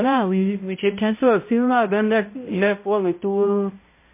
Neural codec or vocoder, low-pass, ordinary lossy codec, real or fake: codec, 16 kHz, 0.5 kbps, X-Codec, HuBERT features, trained on balanced general audio; 3.6 kHz; MP3, 24 kbps; fake